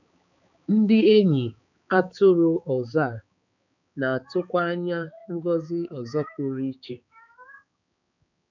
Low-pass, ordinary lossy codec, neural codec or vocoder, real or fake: 7.2 kHz; none; codec, 16 kHz, 4 kbps, X-Codec, HuBERT features, trained on balanced general audio; fake